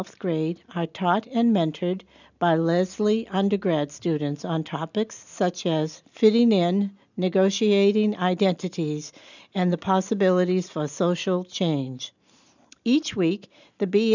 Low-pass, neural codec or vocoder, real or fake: 7.2 kHz; none; real